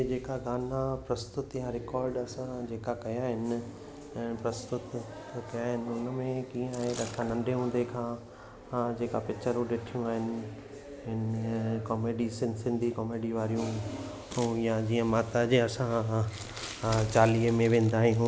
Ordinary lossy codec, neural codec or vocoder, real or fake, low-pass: none; none; real; none